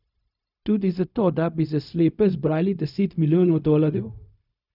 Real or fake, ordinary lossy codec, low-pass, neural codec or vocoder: fake; none; 5.4 kHz; codec, 16 kHz, 0.4 kbps, LongCat-Audio-Codec